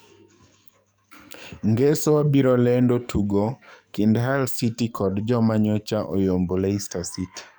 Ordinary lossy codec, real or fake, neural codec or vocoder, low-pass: none; fake; codec, 44.1 kHz, 7.8 kbps, DAC; none